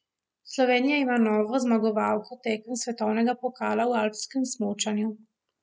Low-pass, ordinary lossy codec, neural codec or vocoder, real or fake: none; none; none; real